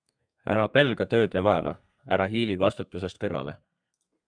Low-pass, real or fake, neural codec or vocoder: 9.9 kHz; fake; codec, 32 kHz, 1.9 kbps, SNAC